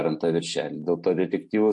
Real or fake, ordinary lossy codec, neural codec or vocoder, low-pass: real; MP3, 48 kbps; none; 10.8 kHz